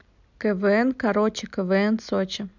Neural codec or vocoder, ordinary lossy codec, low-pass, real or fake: none; none; 7.2 kHz; real